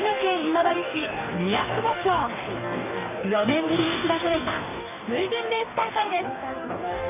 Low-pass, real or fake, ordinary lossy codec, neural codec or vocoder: 3.6 kHz; fake; none; codec, 44.1 kHz, 2.6 kbps, DAC